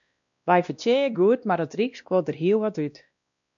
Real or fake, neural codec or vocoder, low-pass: fake; codec, 16 kHz, 1 kbps, X-Codec, WavLM features, trained on Multilingual LibriSpeech; 7.2 kHz